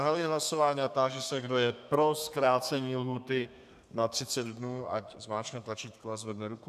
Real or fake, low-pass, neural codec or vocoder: fake; 14.4 kHz; codec, 32 kHz, 1.9 kbps, SNAC